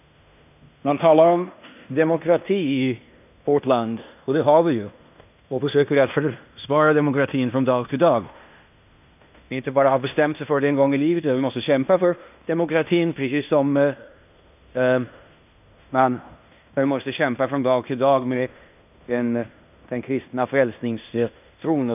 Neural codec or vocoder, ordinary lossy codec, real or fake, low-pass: codec, 16 kHz in and 24 kHz out, 0.9 kbps, LongCat-Audio-Codec, fine tuned four codebook decoder; none; fake; 3.6 kHz